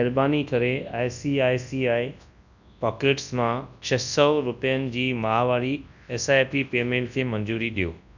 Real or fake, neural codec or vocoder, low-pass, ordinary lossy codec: fake; codec, 24 kHz, 0.9 kbps, WavTokenizer, large speech release; 7.2 kHz; none